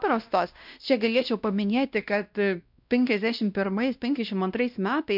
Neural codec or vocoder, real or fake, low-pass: codec, 16 kHz, 1 kbps, X-Codec, WavLM features, trained on Multilingual LibriSpeech; fake; 5.4 kHz